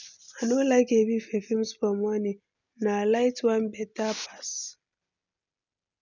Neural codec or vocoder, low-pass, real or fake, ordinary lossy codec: none; 7.2 kHz; real; none